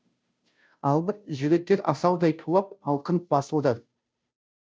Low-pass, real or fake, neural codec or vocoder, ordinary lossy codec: none; fake; codec, 16 kHz, 0.5 kbps, FunCodec, trained on Chinese and English, 25 frames a second; none